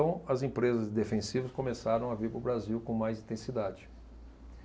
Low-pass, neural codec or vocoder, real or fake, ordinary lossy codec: none; none; real; none